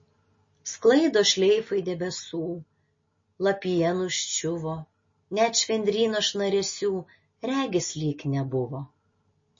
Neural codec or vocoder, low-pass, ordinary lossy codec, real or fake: none; 7.2 kHz; MP3, 32 kbps; real